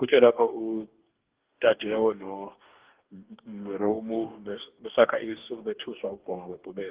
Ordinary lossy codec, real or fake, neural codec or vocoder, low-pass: Opus, 16 kbps; fake; codec, 44.1 kHz, 2.6 kbps, DAC; 3.6 kHz